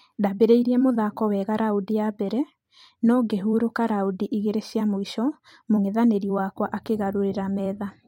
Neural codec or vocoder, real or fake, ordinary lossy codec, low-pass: vocoder, 44.1 kHz, 128 mel bands every 256 samples, BigVGAN v2; fake; MP3, 64 kbps; 19.8 kHz